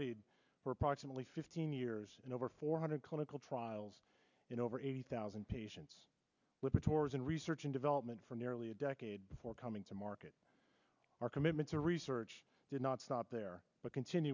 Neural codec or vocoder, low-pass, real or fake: none; 7.2 kHz; real